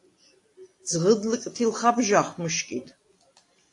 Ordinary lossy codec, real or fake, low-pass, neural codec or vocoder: AAC, 48 kbps; real; 10.8 kHz; none